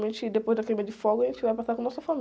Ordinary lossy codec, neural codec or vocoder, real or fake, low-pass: none; none; real; none